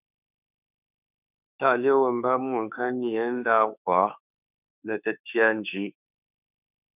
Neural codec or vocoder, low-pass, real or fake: autoencoder, 48 kHz, 32 numbers a frame, DAC-VAE, trained on Japanese speech; 3.6 kHz; fake